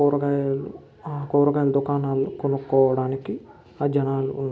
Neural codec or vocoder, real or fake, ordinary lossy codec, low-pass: none; real; none; none